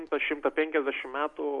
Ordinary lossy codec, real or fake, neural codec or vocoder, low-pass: Opus, 64 kbps; real; none; 10.8 kHz